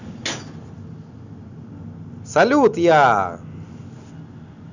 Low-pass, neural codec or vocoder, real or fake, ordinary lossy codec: 7.2 kHz; none; real; none